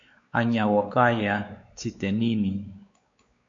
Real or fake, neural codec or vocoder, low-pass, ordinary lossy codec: fake; codec, 16 kHz, 4 kbps, X-Codec, WavLM features, trained on Multilingual LibriSpeech; 7.2 kHz; AAC, 48 kbps